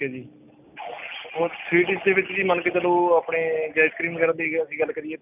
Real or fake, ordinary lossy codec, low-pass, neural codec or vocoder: real; none; 3.6 kHz; none